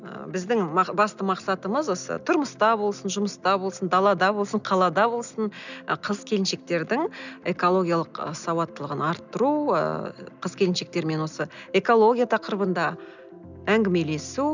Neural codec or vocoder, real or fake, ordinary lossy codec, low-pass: none; real; none; 7.2 kHz